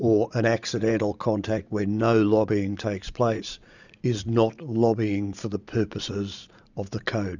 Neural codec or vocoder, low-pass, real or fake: vocoder, 44.1 kHz, 80 mel bands, Vocos; 7.2 kHz; fake